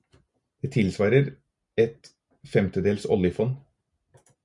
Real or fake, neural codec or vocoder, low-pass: real; none; 10.8 kHz